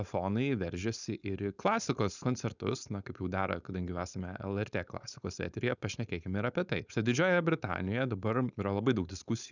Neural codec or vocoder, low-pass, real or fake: codec, 16 kHz, 4.8 kbps, FACodec; 7.2 kHz; fake